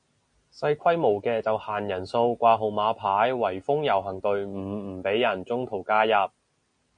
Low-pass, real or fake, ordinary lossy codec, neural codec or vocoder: 9.9 kHz; real; MP3, 48 kbps; none